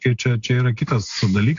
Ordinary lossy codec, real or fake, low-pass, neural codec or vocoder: AAC, 64 kbps; real; 7.2 kHz; none